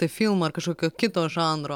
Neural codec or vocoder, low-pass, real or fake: none; 19.8 kHz; real